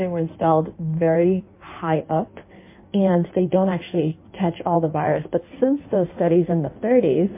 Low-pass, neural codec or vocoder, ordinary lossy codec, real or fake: 3.6 kHz; codec, 16 kHz in and 24 kHz out, 1.1 kbps, FireRedTTS-2 codec; MP3, 24 kbps; fake